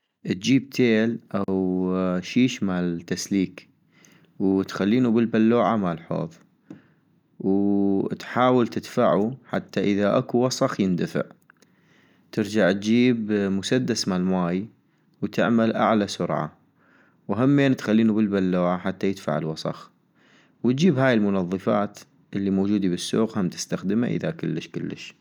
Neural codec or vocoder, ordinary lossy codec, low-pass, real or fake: none; none; 19.8 kHz; real